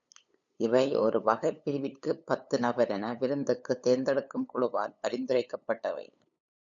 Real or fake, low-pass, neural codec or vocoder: fake; 7.2 kHz; codec, 16 kHz, 8 kbps, FunCodec, trained on LibriTTS, 25 frames a second